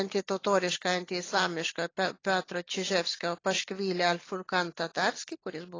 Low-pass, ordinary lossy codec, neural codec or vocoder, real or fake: 7.2 kHz; AAC, 32 kbps; none; real